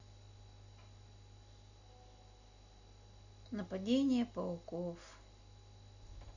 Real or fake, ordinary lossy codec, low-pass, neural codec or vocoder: real; Opus, 64 kbps; 7.2 kHz; none